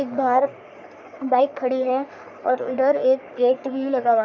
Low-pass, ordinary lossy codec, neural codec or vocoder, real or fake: 7.2 kHz; none; codec, 44.1 kHz, 3.4 kbps, Pupu-Codec; fake